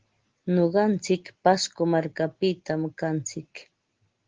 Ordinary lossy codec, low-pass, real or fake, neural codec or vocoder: Opus, 16 kbps; 7.2 kHz; real; none